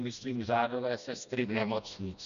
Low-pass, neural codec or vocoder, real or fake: 7.2 kHz; codec, 16 kHz, 1 kbps, FreqCodec, smaller model; fake